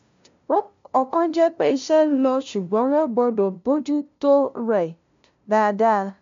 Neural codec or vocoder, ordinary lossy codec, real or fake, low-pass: codec, 16 kHz, 0.5 kbps, FunCodec, trained on LibriTTS, 25 frames a second; MP3, 64 kbps; fake; 7.2 kHz